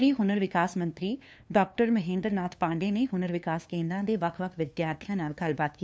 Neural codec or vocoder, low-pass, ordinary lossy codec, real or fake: codec, 16 kHz, 2 kbps, FunCodec, trained on LibriTTS, 25 frames a second; none; none; fake